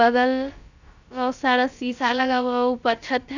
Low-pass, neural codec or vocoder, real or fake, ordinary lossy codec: 7.2 kHz; codec, 16 kHz, about 1 kbps, DyCAST, with the encoder's durations; fake; none